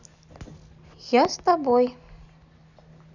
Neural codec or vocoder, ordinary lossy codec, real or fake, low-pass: none; none; real; 7.2 kHz